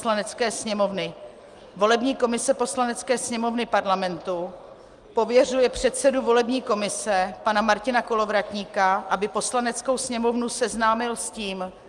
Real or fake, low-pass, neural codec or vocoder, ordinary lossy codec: real; 10.8 kHz; none; Opus, 32 kbps